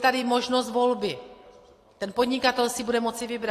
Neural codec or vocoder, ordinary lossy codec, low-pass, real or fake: none; AAC, 48 kbps; 14.4 kHz; real